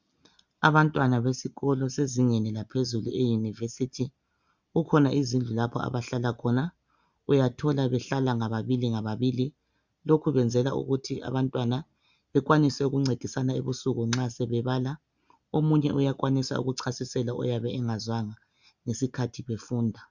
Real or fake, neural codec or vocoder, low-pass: real; none; 7.2 kHz